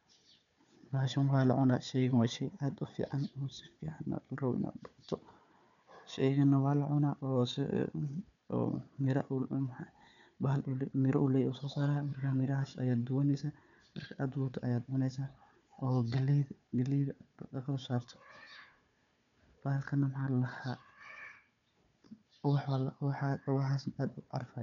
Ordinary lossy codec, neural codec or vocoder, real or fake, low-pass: none; codec, 16 kHz, 4 kbps, FunCodec, trained on Chinese and English, 50 frames a second; fake; 7.2 kHz